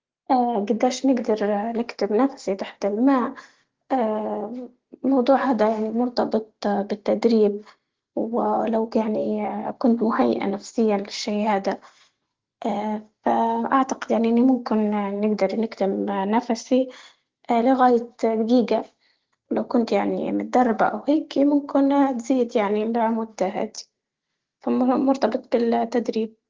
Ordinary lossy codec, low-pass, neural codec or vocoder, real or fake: Opus, 16 kbps; 7.2 kHz; none; real